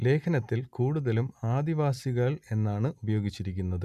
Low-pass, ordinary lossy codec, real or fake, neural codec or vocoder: 14.4 kHz; none; real; none